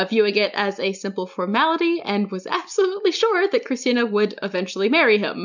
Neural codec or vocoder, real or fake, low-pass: none; real; 7.2 kHz